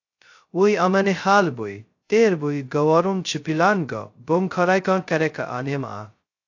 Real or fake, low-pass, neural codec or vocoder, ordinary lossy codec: fake; 7.2 kHz; codec, 16 kHz, 0.2 kbps, FocalCodec; AAC, 48 kbps